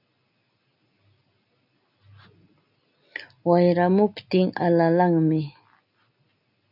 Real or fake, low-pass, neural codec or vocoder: real; 5.4 kHz; none